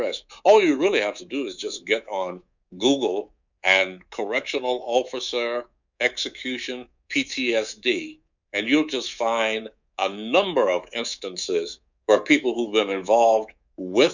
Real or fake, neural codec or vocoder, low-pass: fake; codec, 24 kHz, 3.1 kbps, DualCodec; 7.2 kHz